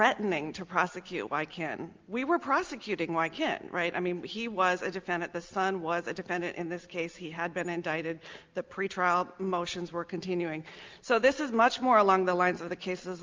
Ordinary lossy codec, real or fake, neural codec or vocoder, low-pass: Opus, 32 kbps; real; none; 7.2 kHz